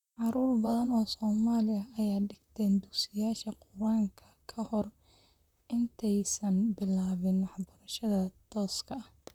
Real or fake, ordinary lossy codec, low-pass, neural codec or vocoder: fake; none; 19.8 kHz; vocoder, 44.1 kHz, 128 mel bands, Pupu-Vocoder